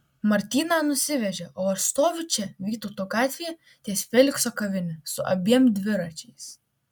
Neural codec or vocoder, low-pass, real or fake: none; 19.8 kHz; real